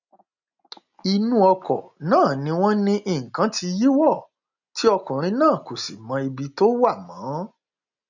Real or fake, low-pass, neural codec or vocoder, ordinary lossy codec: real; 7.2 kHz; none; none